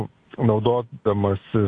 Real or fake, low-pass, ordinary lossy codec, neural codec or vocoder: real; 9.9 kHz; AAC, 48 kbps; none